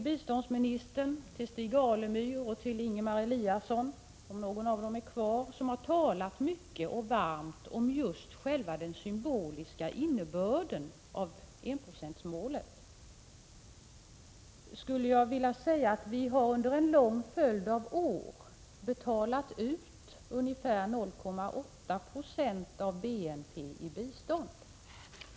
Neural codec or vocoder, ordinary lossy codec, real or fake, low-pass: none; none; real; none